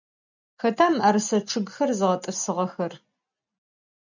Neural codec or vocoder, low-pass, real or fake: none; 7.2 kHz; real